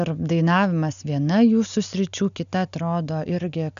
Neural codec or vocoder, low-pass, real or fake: none; 7.2 kHz; real